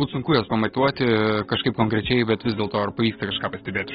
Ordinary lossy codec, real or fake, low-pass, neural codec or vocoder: AAC, 16 kbps; real; 19.8 kHz; none